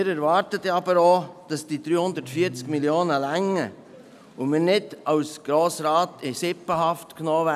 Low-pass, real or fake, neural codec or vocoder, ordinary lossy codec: 14.4 kHz; real; none; none